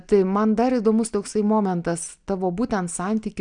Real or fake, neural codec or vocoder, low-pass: real; none; 9.9 kHz